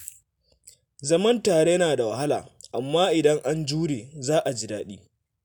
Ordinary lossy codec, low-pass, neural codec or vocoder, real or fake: none; none; none; real